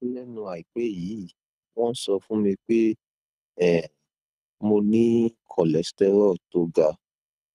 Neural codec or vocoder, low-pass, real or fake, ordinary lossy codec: codec, 24 kHz, 6 kbps, HILCodec; none; fake; none